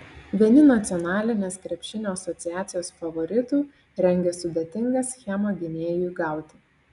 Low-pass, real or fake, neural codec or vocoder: 10.8 kHz; real; none